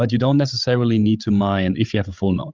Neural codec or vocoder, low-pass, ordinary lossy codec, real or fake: codec, 16 kHz, 16 kbps, FreqCodec, larger model; 7.2 kHz; Opus, 24 kbps; fake